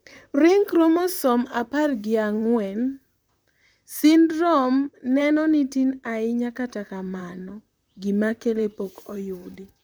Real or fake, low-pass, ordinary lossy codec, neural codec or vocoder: fake; none; none; vocoder, 44.1 kHz, 128 mel bands, Pupu-Vocoder